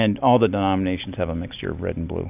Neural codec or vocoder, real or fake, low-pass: none; real; 3.6 kHz